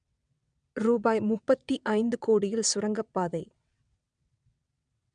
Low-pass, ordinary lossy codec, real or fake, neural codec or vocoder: 9.9 kHz; none; fake; vocoder, 22.05 kHz, 80 mel bands, WaveNeXt